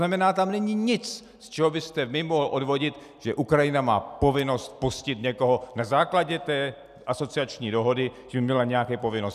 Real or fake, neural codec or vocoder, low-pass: real; none; 14.4 kHz